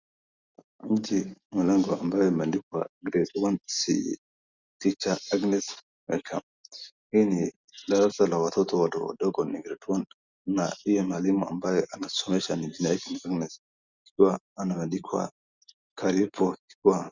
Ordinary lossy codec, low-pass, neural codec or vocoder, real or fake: Opus, 64 kbps; 7.2 kHz; none; real